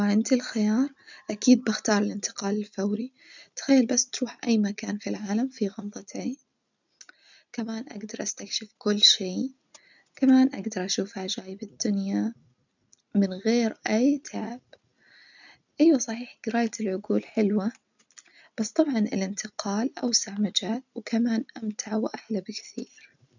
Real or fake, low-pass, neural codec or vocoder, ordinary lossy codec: real; 7.2 kHz; none; none